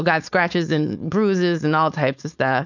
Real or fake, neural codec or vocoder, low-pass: fake; codec, 16 kHz, 4.8 kbps, FACodec; 7.2 kHz